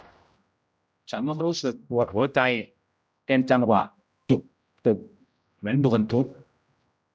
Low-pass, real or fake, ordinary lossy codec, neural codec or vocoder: none; fake; none; codec, 16 kHz, 0.5 kbps, X-Codec, HuBERT features, trained on general audio